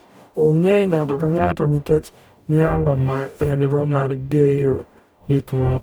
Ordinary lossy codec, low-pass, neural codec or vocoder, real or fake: none; none; codec, 44.1 kHz, 0.9 kbps, DAC; fake